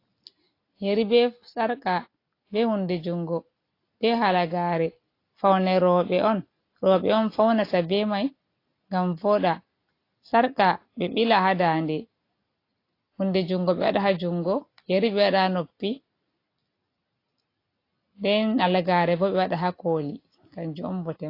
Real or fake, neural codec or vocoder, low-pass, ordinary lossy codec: real; none; 5.4 kHz; AAC, 32 kbps